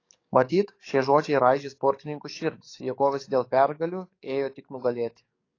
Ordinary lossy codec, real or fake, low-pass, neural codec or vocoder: AAC, 32 kbps; fake; 7.2 kHz; codec, 44.1 kHz, 7.8 kbps, DAC